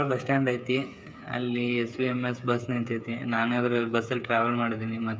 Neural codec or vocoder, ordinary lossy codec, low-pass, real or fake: codec, 16 kHz, 8 kbps, FreqCodec, smaller model; none; none; fake